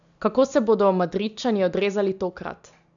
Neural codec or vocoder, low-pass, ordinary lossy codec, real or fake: none; 7.2 kHz; none; real